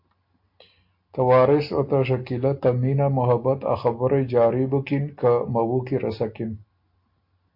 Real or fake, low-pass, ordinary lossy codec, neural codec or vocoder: real; 5.4 kHz; MP3, 32 kbps; none